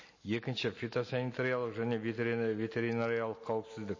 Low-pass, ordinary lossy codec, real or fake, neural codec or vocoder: 7.2 kHz; MP3, 32 kbps; real; none